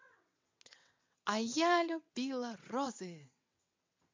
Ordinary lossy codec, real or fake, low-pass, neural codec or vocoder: MP3, 64 kbps; fake; 7.2 kHz; vocoder, 22.05 kHz, 80 mel bands, WaveNeXt